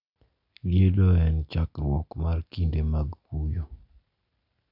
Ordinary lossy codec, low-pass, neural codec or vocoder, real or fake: none; 5.4 kHz; none; real